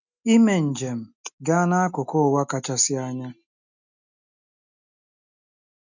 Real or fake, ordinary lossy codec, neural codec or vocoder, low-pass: real; none; none; 7.2 kHz